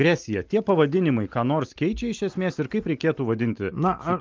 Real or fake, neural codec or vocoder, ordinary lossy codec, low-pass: real; none; Opus, 32 kbps; 7.2 kHz